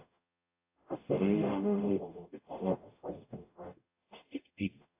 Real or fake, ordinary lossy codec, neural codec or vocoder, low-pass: fake; AAC, 32 kbps; codec, 44.1 kHz, 0.9 kbps, DAC; 3.6 kHz